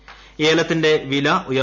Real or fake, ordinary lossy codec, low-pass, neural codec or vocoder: real; none; 7.2 kHz; none